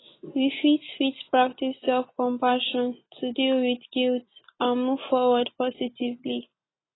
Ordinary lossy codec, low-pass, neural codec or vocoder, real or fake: AAC, 16 kbps; 7.2 kHz; none; real